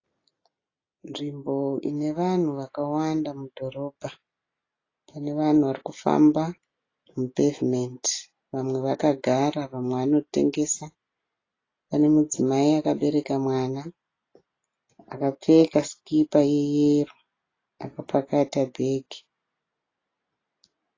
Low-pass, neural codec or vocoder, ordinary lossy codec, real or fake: 7.2 kHz; none; AAC, 32 kbps; real